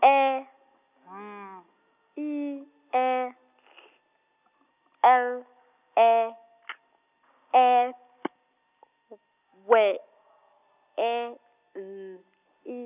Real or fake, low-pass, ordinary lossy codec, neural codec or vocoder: real; 3.6 kHz; none; none